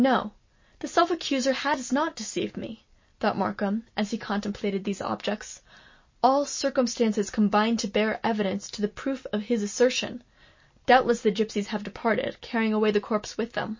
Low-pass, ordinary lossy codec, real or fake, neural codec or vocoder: 7.2 kHz; MP3, 32 kbps; real; none